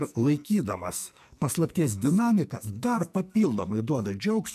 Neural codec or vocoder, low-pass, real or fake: codec, 44.1 kHz, 2.6 kbps, SNAC; 14.4 kHz; fake